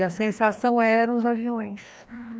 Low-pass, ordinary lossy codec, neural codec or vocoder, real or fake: none; none; codec, 16 kHz, 1 kbps, FreqCodec, larger model; fake